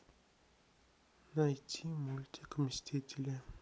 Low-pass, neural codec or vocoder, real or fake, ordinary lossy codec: none; none; real; none